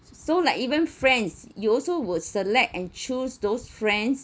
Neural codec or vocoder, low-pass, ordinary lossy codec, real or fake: none; none; none; real